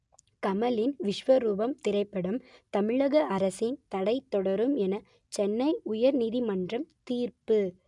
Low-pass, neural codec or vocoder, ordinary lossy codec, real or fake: 10.8 kHz; none; none; real